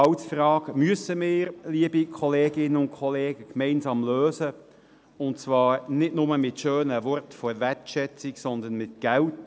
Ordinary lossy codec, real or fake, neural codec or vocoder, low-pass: none; real; none; none